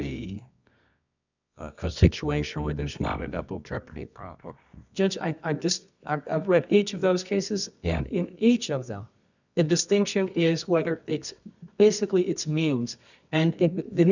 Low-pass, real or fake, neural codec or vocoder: 7.2 kHz; fake; codec, 24 kHz, 0.9 kbps, WavTokenizer, medium music audio release